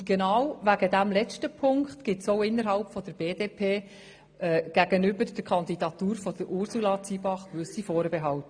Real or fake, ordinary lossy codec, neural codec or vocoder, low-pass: real; AAC, 64 kbps; none; 9.9 kHz